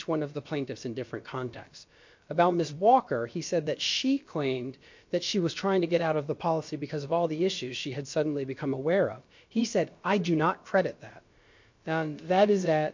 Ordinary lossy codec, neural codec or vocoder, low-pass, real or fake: MP3, 48 kbps; codec, 16 kHz, about 1 kbps, DyCAST, with the encoder's durations; 7.2 kHz; fake